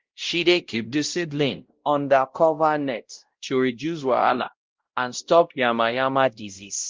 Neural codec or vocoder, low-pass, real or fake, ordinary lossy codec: codec, 16 kHz, 0.5 kbps, X-Codec, WavLM features, trained on Multilingual LibriSpeech; 7.2 kHz; fake; Opus, 16 kbps